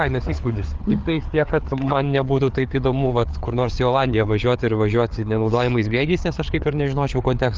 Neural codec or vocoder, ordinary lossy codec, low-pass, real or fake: codec, 16 kHz, 4 kbps, FreqCodec, larger model; Opus, 24 kbps; 7.2 kHz; fake